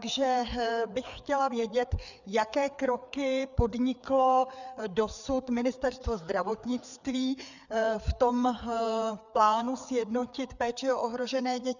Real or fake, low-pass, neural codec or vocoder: fake; 7.2 kHz; codec, 16 kHz, 4 kbps, FreqCodec, larger model